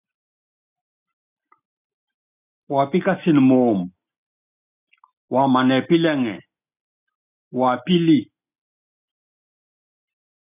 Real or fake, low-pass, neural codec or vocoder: real; 3.6 kHz; none